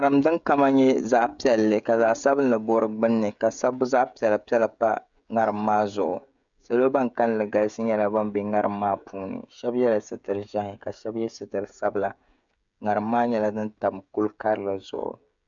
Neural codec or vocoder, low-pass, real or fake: codec, 16 kHz, 16 kbps, FreqCodec, smaller model; 7.2 kHz; fake